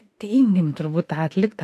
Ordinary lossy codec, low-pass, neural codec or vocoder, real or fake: AAC, 64 kbps; 14.4 kHz; autoencoder, 48 kHz, 32 numbers a frame, DAC-VAE, trained on Japanese speech; fake